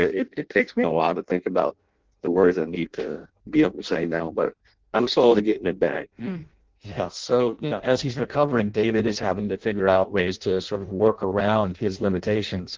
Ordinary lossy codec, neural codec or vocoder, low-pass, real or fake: Opus, 16 kbps; codec, 16 kHz in and 24 kHz out, 0.6 kbps, FireRedTTS-2 codec; 7.2 kHz; fake